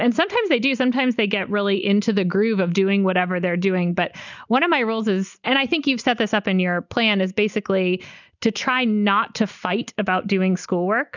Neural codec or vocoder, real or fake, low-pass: none; real; 7.2 kHz